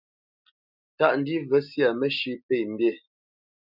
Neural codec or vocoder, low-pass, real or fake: none; 5.4 kHz; real